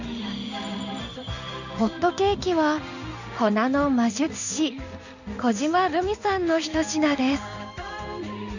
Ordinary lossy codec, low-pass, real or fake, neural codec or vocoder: none; 7.2 kHz; fake; codec, 16 kHz in and 24 kHz out, 1 kbps, XY-Tokenizer